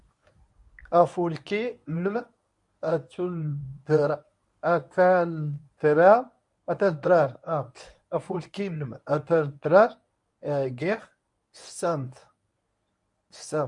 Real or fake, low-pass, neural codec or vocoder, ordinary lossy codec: fake; 10.8 kHz; codec, 24 kHz, 0.9 kbps, WavTokenizer, medium speech release version 1; MP3, 96 kbps